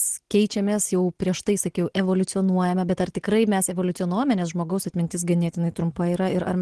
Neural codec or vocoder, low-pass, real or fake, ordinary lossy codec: none; 10.8 kHz; real; Opus, 16 kbps